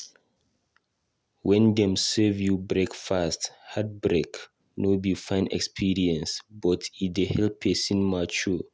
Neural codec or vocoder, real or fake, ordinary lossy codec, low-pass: none; real; none; none